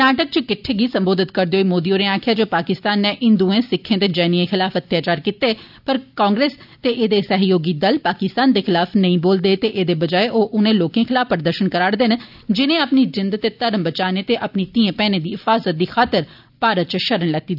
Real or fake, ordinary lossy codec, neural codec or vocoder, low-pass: real; none; none; 5.4 kHz